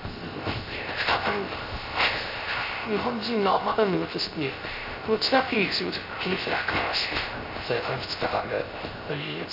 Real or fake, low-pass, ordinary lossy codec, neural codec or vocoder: fake; 5.4 kHz; none; codec, 16 kHz, 0.3 kbps, FocalCodec